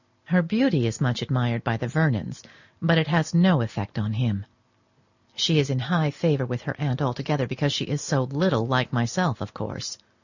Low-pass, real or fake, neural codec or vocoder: 7.2 kHz; real; none